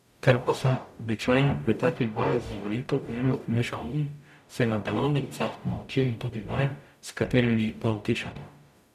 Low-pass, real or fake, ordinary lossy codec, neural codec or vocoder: 14.4 kHz; fake; none; codec, 44.1 kHz, 0.9 kbps, DAC